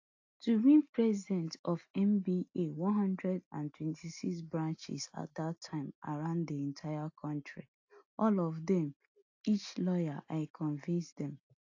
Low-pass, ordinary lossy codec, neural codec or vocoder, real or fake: 7.2 kHz; AAC, 48 kbps; none; real